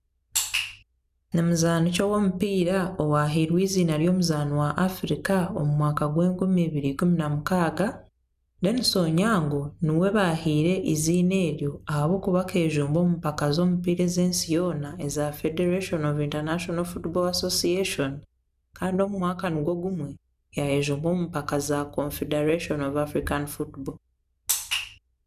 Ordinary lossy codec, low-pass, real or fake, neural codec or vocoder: none; 14.4 kHz; real; none